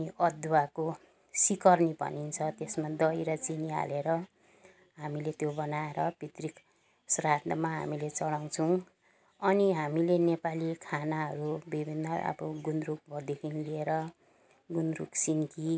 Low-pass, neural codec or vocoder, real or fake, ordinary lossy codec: none; none; real; none